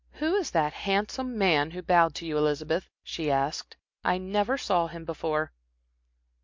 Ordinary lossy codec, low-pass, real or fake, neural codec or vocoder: MP3, 48 kbps; 7.2 kHz; real; none